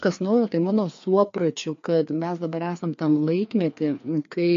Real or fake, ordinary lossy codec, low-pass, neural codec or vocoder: fake; MP3, 48 kbps; 7.2 kHz; codec, 16 kHz, 2 kbps, FreqCodec, larger model